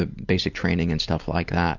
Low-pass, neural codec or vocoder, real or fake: 7.2 kHz; none; real